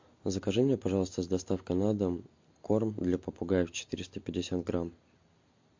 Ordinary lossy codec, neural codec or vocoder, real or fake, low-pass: MP3, 48 kbps; none; real; 7.2 kHz